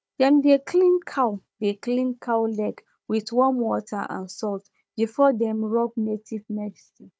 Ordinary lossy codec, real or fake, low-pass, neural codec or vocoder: none; fake; none; codec, 16 kHz, 4 kbps, FunCodec, trained on Chinese and English, 50 frames a second